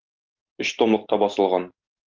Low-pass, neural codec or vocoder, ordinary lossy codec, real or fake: 7.2 kHz; none; Opus, 32 kbps; real